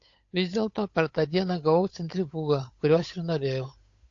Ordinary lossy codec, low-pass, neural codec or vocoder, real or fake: AAC, 48 kbps; 7.2 kHz; codec, 16 kHz, 8 kbps, FunCodec, trained on Chinese and English, 25 frames a second; fake